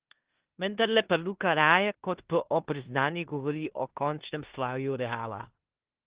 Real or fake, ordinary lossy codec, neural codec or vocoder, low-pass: fake; Opus, 16 kbps; codec, 16 kHz in and 24 kHz out, 0.9 kbps, LongCat-Audio-Codec, four codebook decoder; 3.6 kHz